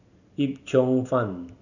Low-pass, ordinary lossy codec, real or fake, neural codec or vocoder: 7.2 kHz; none; real; none